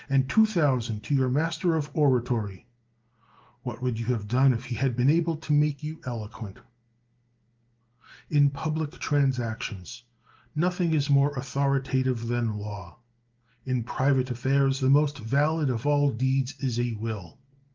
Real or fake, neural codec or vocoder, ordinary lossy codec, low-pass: real; none; Opus, 32 kbps; 7.2 kHz